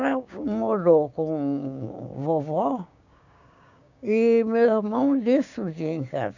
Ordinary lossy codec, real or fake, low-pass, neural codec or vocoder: none; fake; 7.2 kHz; codec, 16 kHz, 6 kbps, DAC